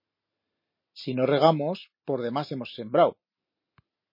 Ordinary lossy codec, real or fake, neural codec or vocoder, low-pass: MP3, 24 kbps; real; none; 5.4 kHz